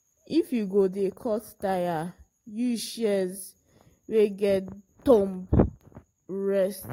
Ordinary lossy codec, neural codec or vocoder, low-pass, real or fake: AAC, 48 kbps; none; 19.8 kHz; real